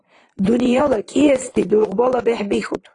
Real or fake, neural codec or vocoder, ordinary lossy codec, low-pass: fake; vocoder, 22.05 kHz, 80 mel bands, Vocos; AAC, 32 kbps; 9.9 kHz